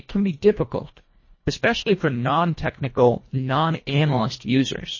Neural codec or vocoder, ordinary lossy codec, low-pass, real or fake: codec, 24 kHz, 1.5 kbps, HILCodec; MP3, 32 kbps; 7.2 kHz; fake